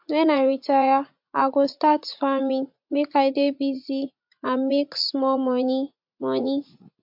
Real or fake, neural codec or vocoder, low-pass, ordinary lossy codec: fake; vocoder, 44.1 kHz, 80 mel bands, Vocos; 5.4 kHz; MP3, 48 kbps